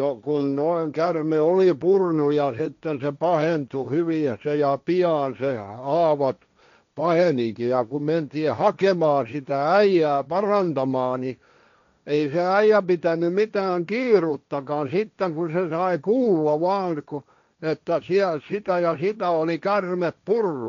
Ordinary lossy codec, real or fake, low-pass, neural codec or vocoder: none; fake; 7.2 kHz; codec, 16 kHz, 1.1 kbps, Voila-Tokenizer